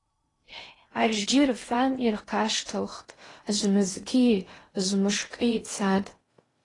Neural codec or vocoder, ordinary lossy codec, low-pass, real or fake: codec, 16 kHz in and 24 kHz out, 0.6 kbps, FocalCodec, streaming, 2048 codes; AAC, 32 kbps; 10.8 kHz; fake